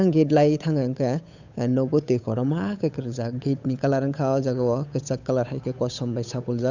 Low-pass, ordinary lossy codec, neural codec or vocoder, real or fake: 7.2 kHz; none; codec, 16 kHz, 8 kbps, FunCodec, trained on Chinese and English, 25 frames a second; fake